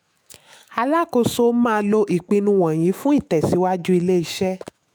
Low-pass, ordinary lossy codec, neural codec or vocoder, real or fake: none; none; autoencoder, 48 kHz, 128 numbers a frame, DAC-VAE, trained on Japanese speech; fake